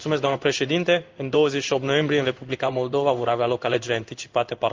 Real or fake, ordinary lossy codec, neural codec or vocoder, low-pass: fake; Opus, 24 kbps; codec, 16 kHz in and 24 kHz out, 1 kbps, XY-Tokenizer; 7.2 kHz